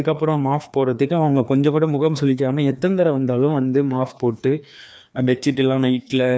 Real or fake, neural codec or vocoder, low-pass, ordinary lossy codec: fake; codec, 16 kHz, 2 kbps, FreqCodec, larger model; none; none